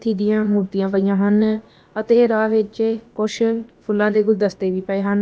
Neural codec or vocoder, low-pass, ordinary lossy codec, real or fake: codec, 16 kHz, about 1 kbps, DyCAST, with the encoder's durations; none; none; fake